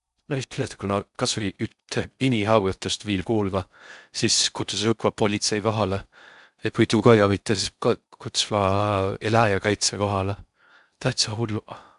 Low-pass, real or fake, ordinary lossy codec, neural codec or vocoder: 10.8 kHz; fake; none; codec, 16 kHz in and 24 kHz out, 0.6 kbps, FocalCodec, streaming, 4096 codes